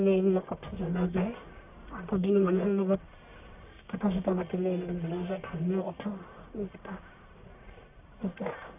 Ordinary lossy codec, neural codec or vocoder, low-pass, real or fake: none; codec, 44.1 kHz, 1.7 kbps, Pupu-Codec; 3.6 kHz; fake